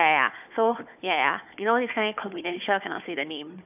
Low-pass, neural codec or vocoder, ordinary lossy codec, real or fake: 3.6 kHz; codec, 16 kHz, 4 kbps, FunCodec, trained on Chinese and English, 50 frames a second; none; fake